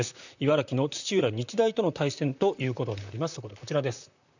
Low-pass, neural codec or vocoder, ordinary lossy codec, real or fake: 7.2 kHz; vocoder, 44.1 kHz, 128 mel bands, Pupu-Vocoder; none; fake